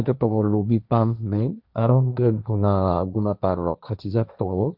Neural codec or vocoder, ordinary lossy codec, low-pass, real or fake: codec, 16 kHz, 1.1 kbps, Voila-Tokenizer; Opus, 64 kbps; 5.4 kHz; fake